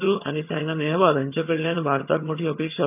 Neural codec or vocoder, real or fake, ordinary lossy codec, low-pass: vocoder, 22.05 kHz, 80 mel bands, HiFi-GAN; fake; none; 3.6 kHz